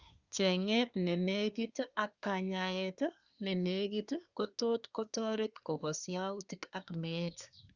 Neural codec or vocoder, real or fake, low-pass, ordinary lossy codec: codec, 24 kHz, 1 kbps, SNAC; fake; 7.2 kHz; Opus, 64 kbps